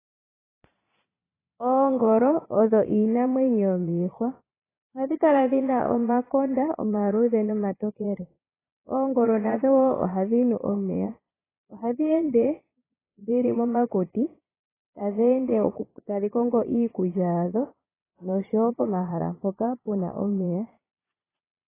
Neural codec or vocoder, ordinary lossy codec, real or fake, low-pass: vocoder, 22.05 kHz, 80 mel bands, Vocos; AAC, 16 kbps; fake; 3.6 kHz